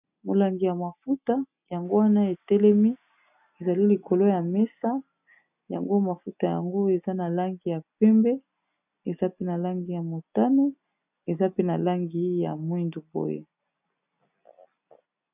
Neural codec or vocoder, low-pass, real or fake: none; 3.6 kHz; real